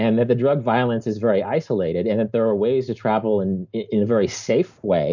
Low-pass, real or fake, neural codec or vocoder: 7.2 kHz; real; none